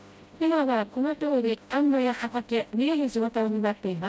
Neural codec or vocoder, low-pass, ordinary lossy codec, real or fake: codec, 16 kHz, 0.5 kbps, FreqCodec, smaller model; none; none; fake